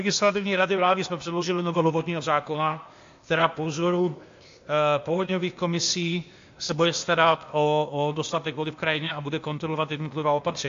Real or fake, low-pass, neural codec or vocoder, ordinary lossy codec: fake; 7.2 kHz; codec, 16 kHz, 0.8 kbps, ZipCodec; AAC, 48 kbps